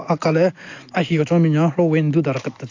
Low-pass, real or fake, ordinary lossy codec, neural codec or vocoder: 7.2 kHz; real; AAC, 48 kbps; none